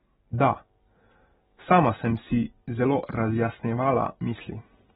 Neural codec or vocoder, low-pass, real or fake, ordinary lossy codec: none; 10.8 kHz; real; AAC, 16 kbps